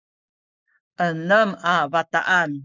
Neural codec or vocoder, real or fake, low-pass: codec, 44.1 kHz, 7.8 kbps, Pupu-Codec; fake; 7.2 kHz